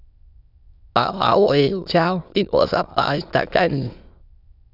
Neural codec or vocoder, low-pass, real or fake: autoencoder, 22.05 kHz, a latent of 192 numbers a frame, VITS, trained on many speakers; 5.4 kHz; fake